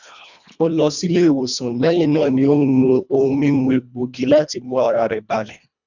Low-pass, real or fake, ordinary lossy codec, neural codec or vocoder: 7.2 kHz; fake; none; codec, 24 kHz, 1.5 kbps, HILCodec